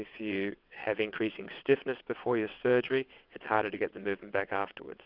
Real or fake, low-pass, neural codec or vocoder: fake; 5.4 kHz; vocoder, 22.05 kHz, 80 mel bands, WaveNeXt